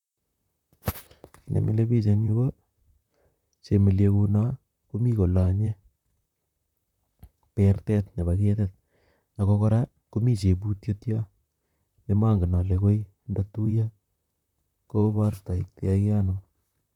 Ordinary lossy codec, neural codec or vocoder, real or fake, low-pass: none; vocoder, 44.1 kHz, 128 mel bands, Pupu-Vocoder; fake; 19.8 kHz